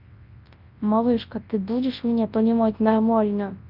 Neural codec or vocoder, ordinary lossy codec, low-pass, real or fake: codec, 24 kHz, 0.9 kbps, WavTokenizer, large speech release; Opus, 24 kbps; 5.4 kHz; fake